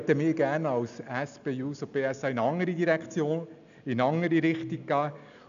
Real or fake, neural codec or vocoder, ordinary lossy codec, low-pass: real; none; none; 7.2 kHz